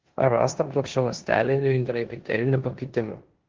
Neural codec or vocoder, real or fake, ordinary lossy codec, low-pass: codec, 16 kHz in and 24 kHz out, 0.9 kbps, LongCat-Audio-Codec, four codebook decoder; fake; Opus, 16 kbps; 7.2 kHz